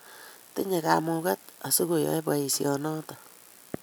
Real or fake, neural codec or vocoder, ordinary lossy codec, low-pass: real; none; none; none